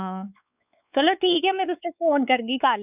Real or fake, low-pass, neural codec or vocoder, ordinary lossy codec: fake; 3.6 kHz; codec, 16 kHz, 4 kbps, X-Codec, WavLM features, trained on Multilingual LibriSpeech; none